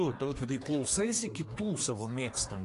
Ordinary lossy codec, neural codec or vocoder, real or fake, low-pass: AAC, 48 kbps; codec, 24 kHz, 1 kbps, SNAC; fake; 10.8 kHz